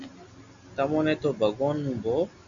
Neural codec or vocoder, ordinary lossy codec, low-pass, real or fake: none; MP3, 64 kbps; 7.2 kHz; real